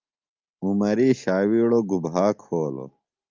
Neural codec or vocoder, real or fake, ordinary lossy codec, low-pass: none; real; Opus, 32 kbps; 7.2 kHz